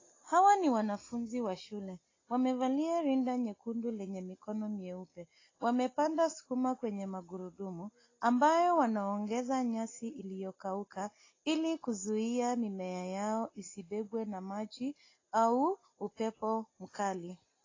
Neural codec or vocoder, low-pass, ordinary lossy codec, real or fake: none; 7.2 kHz; AAC, 32 kbps; real